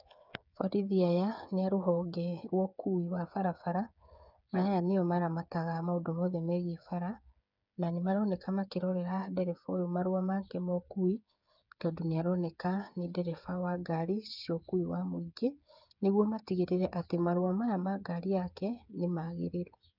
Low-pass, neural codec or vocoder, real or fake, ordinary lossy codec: 5.4 kHz; codec, 16 kHz, 8 kbps, FreqCodec, smaller model; fake; none